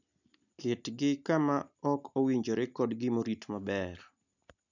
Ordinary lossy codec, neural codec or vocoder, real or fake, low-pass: none; none; real; 7.2 kHz